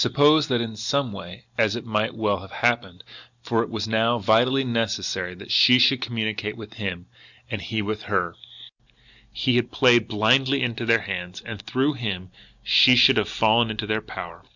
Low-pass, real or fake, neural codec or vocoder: 7.2 kHz; real; none